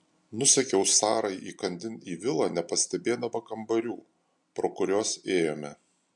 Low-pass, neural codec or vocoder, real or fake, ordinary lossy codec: 10.8 kHz; none; real; MP3, 64 kbps